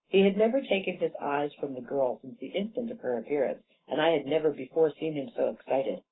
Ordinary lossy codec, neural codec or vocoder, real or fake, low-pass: AAC, 16 kbps; codec, 44.1 kHz, 7.8 kbps, Pupu-Codec; fake; 7.2 kHz